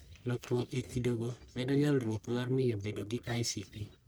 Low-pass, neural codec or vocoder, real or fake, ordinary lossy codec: none; codec, 44.1 kHz, 1.7 kbps, Pupu-Codec; fake; none